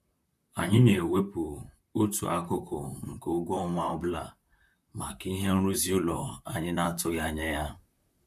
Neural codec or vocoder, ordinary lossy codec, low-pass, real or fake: vocoder, 44.1 kHz, 128 mel bands, Pupu-Vocoder; none; 14.4 kHz; fake